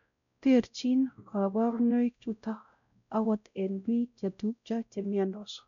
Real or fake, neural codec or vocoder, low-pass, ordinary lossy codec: fake; codec, 16 kHz, 0.5 kbps, X-Codec, WavLM features, trained on Multilingual LibriSpeech; 7.2 kHz; MP3, 96 kbps